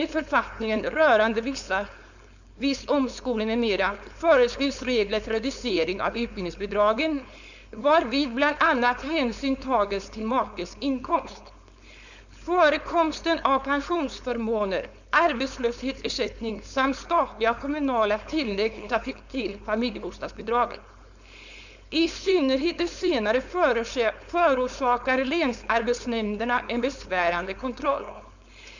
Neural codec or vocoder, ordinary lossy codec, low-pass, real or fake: codec, 16 kHz, 4.8 kbps, FACodec; none; 7.2 kHz; fake